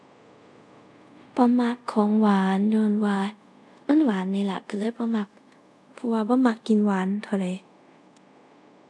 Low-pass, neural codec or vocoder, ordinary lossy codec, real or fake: none; codec, 24 kHz, 0.5 kbps, DualCodec; none; fake